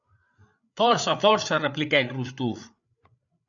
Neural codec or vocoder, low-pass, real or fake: codec, 16 kHz, 8 kbps, FreqCodec, larger model; 7.2 kHz; fake